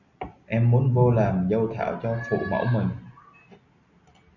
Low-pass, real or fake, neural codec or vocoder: 7.2 kHz; real; none